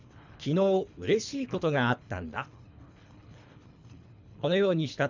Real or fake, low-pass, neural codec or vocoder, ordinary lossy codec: fake; 7.2 kHz; codec, 24 kHz, 3 kbps, HILCodec; none